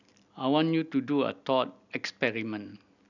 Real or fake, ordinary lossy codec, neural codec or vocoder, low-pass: real; none; none; 7.2 kHz